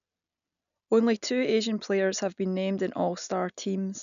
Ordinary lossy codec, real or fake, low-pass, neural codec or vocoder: none; real; 7.2 kHz; none